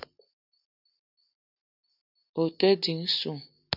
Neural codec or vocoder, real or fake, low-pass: none; real; 5.4 kHz